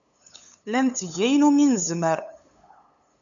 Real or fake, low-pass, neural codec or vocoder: fake; 7.2 kHz; codec, 16 kHz, 8 kbps, FunCodec, trained on LibriTTS, 25 frames a second